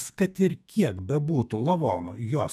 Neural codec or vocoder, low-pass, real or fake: codec, 32 kHz, 1.9 kbps, SNAC; 14.4 kHz; fake